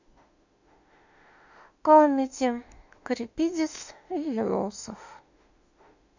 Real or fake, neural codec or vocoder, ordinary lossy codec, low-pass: fake; autoencoder, 48 kHz, 32 numbers a frame, DAC-VAE, trained on Japanese speech; none; 7.2 kHz